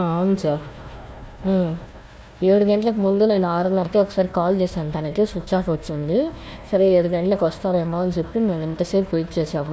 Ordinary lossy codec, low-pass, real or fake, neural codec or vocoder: none; none; fake; codec, 16 kHz, 1 kbps, FunCodec, trained on Chinese and English, 50 frames a second